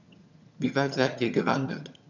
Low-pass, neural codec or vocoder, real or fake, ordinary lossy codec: 7.2 kHz; vocoder, 22.05 kHz, 80 mel bands, HiFi-GAN; fake; none